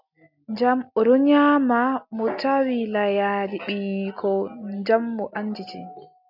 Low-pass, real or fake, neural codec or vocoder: 5.4 kHz; real; none